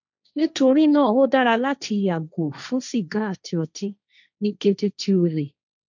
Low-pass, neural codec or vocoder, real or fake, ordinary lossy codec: 7.2 kHz; codec, 16 kHz, 1.1 kbps, Voila-Tokenizer; fake; none